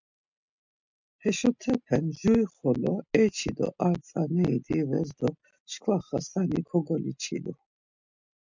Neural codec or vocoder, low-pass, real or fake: none; 7.2 kHz; real